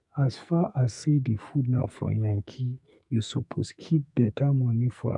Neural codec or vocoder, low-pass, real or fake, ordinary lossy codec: codec, 44.1 kHz, 2.6 kbps, SNAC; 10.8 kHz; fake; none